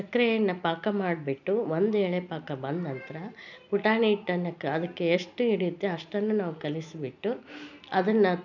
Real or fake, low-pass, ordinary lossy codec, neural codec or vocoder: real; 7.2 kHz; none; none